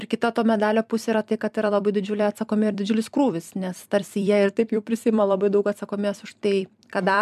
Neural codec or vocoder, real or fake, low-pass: vocoder, 44.1 kHz, 128 mel bands every 256 samples, BigVGAN v2; fake; 14.4 kHz